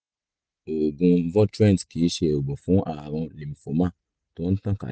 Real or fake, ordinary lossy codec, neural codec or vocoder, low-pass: real; none; none; none